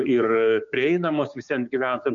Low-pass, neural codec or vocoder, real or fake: 7.2 kHz; codec, 16 kHz, 6 kbps, DAC; fake